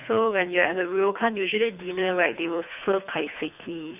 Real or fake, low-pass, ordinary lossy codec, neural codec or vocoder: fake; 3.6 kHz; none; codec, 24 kHz, 3 kbps, HILCodec